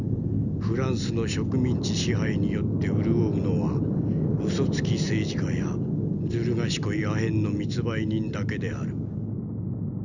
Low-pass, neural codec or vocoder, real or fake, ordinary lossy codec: 7.2 kHz; none; real; none